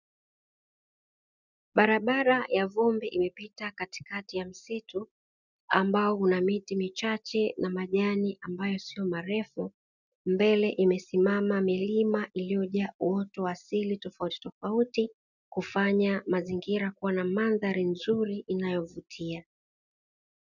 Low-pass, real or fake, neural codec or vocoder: 7.2 kHz; real; none